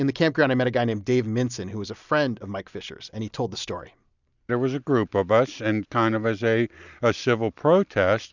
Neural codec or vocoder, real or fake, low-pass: none; real; 7.2 kHz